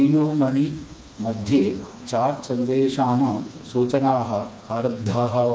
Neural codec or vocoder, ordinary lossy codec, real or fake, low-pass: codec, 16 kHz, 2 kbps, FreqCodec, smaller model; none; fake; none